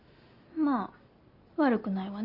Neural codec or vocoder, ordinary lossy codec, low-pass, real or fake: none; Opus, 64 kbps; 5.4 kHz; real